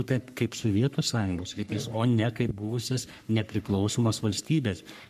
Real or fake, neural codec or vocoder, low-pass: fake; codec, 44.1 kHz, 3.4 kbps, Pupu-Codec; 14.4 kHz